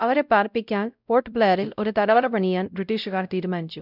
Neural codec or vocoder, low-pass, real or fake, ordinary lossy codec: codec, 16 kHz, 0.5 kbps, X-Codec, WavLM features, trained on Multilingual LibriSpeech; 5.4 kHz; fake; none